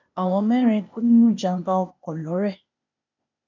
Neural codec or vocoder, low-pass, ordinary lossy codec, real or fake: codec, 16 kHz, 0.8 kbps, ZipCodec; 7.2 kHz; none; fake